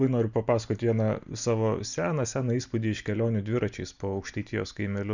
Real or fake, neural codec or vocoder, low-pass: real; none; 7.2 kHz